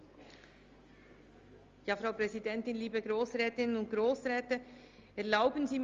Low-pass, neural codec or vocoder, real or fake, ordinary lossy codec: 7.2 kHz; none; real; Opus, 32 kbps